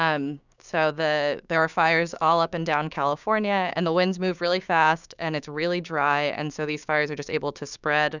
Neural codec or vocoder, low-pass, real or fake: codec, 16 kHz, 6 kbps, DAC; 7.2 kHz; fake